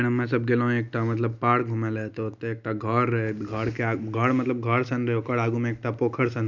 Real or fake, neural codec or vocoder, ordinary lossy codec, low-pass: real; none; none; 7.2 kHz